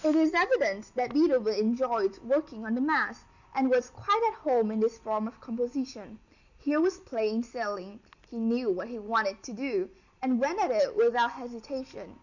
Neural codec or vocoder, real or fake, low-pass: none; real; 7.2 kHz